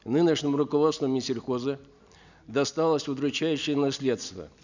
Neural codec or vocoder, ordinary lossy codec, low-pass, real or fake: none; none; 7.2 kHz; real